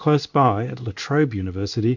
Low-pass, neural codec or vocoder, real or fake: 7.2 kHz; codec, 16 kHz in and 24 kHz out, 1 kbps, XY-Tokenizer; fake